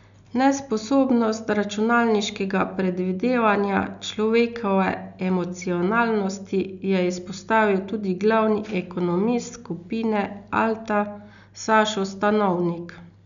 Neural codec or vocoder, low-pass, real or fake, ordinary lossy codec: none; 7.2 kHz; real; none